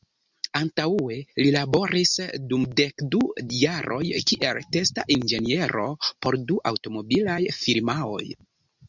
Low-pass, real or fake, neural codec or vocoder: 7.2 kHz; real; none